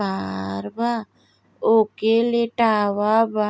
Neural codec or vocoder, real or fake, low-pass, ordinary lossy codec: none; real; none; none